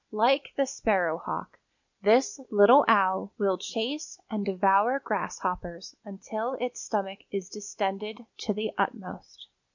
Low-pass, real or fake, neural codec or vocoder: 7.2 kHz; real; none